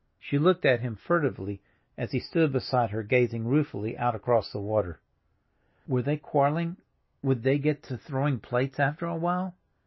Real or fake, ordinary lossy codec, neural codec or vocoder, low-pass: real; MP3, 24 kbps; none; 7.2 kHz